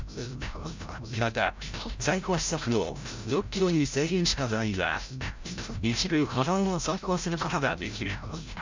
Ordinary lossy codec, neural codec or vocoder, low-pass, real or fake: MP3, 64 kbps; codec, 16 kHz, 0.5 kbps, FreqCodec, larger model; 7.2 kHz; fake